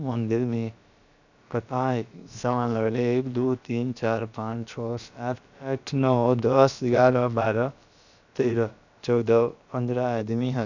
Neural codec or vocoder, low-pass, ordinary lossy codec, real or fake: codec, 16 kHz, about 1 kbps, DyCAST, with the encoder's durations; 7.2 kHz; none; fake